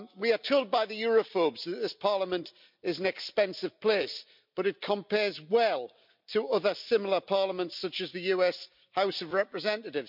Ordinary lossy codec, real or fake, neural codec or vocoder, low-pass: MP3, 48 kbps; real; none; 5.4 kHz